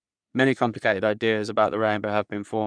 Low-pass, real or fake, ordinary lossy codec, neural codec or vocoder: 9.9 kHz; fake; none; codec, 44.1 kHz, 3.4 kbps, Pupu-Codec